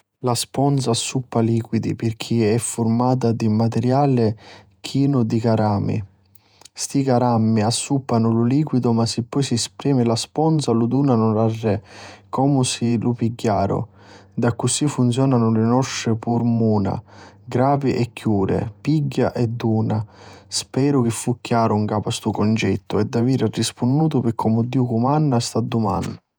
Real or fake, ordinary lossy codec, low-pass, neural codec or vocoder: fake; none; none; vocoder, 48 kHz, 128 mel bands, Vocos